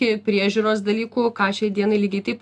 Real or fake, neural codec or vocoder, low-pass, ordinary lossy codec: real; none; 10.8 kHz; AAC, 64 kbps